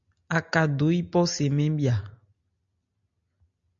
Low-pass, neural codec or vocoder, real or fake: 7.2 kHz; none; real